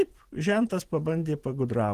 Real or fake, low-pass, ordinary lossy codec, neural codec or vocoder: fake; 14.4 kHz; Opus, 16 kbps; vocoder, 44.1 kHz, 128 mel bands every 512 samples, BigVGAN v2